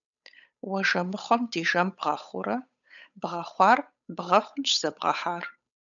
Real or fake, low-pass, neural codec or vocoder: fake; 7.2 kHz; codec, 16 kHz, 8 kbps, FunCodec, trained on Chinese and English, 25 frames a second